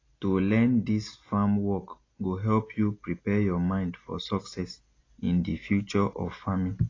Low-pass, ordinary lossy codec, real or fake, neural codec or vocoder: 7.2 kHz; AAC, 32 kbps; real; none